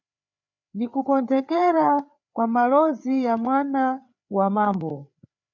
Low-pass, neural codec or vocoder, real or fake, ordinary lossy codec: 7.2 kHz; codec, 16 kHz, 4 kbps, FreqCodec, larger model; fake; MP3, 64 kbps